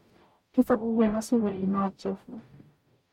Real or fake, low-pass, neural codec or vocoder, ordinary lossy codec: fake; 19.8 kHz; codec, 44.1 kHz, 0.9 kbps, DAC; MP3, 64 kbps